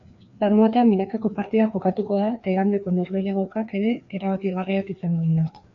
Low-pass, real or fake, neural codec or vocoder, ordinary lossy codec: 7.2 kHz; fake; codec, 16 kHz, 2 kbps, FreqCodec, larger model; AAC, 64 kbps